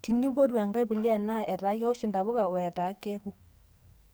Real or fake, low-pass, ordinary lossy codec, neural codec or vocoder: fake; none; none; codec, 44.1 kHz, 2.6 kbps, SNAC